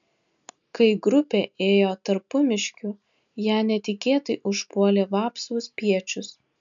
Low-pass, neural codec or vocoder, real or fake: 7.2 kHz; none; real